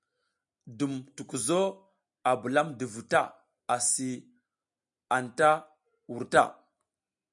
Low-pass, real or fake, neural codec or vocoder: 10.8 kHz; real; none